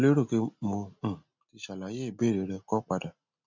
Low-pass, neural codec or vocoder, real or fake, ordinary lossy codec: 7.2 kHz; none; real; MP3, 64 kbps